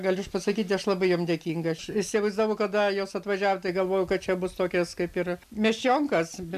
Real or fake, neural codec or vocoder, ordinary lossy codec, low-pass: real; none; MP3, 96 kbps; 14.4 kHz